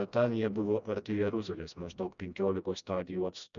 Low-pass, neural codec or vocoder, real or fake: 7.2 kHz; codec, 16 kHz, 1 kbps, FreqCodec, smaller model; fake